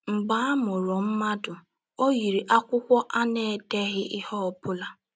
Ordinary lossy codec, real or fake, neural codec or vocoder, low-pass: none; real; none; none